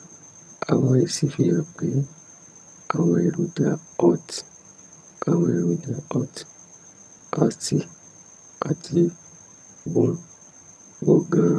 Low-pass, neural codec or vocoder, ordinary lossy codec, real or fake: none; vocoder, 22.05 kHz, 80 mel bands, HiFi-GAN; none; fake